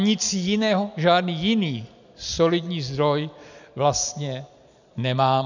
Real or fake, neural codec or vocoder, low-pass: real; none; 7.2 kHz